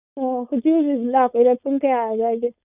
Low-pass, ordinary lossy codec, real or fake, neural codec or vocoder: 3.6 kHz; Opus, 64 kbps; fake; codec, 16 kHz, 4.8 kbps, FACodec